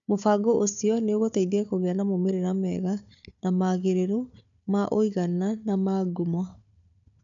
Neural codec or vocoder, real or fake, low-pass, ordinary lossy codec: codec, 16 kHz, 4 kbps, FunCodec, trained on Chinese and English, 50 frames a second; fake; 7.2 kHz; none